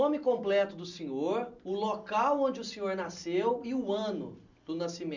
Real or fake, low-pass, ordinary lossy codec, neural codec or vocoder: real; 7.2 kHz; none; none